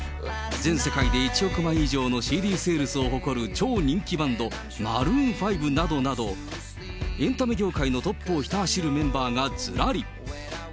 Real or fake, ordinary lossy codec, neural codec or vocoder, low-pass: real; none; none; none